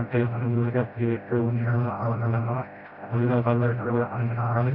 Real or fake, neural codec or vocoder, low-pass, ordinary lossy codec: fake; codec, 16 kHz, 0.5 kbps, FreqCodec, smaller model; 5.4 kHz; none